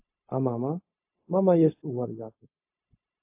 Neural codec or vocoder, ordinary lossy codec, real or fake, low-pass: codec, 16 kHz, 0.4 kbps, LongCat-Audio-Codec; AAC, 32 kbps; fake; 3.6 kHz